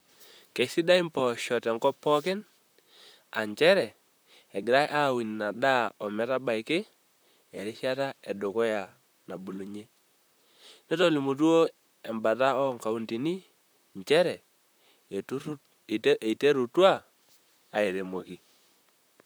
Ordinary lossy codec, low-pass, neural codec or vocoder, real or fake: none; none; vocoder, 44.1 kHz, 128 mel bands, Pupu-Vocoder; fake